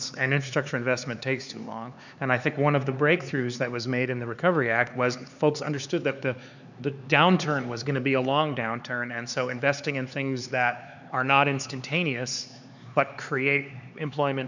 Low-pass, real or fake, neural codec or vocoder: 7.2 kHz; fake; codec, 16 kHz, 4 kbps, X-Codec, HuBERT features, trained on LibriSpeech